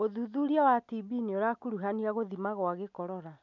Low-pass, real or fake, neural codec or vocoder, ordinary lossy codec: 7.2 kHz; real; none; none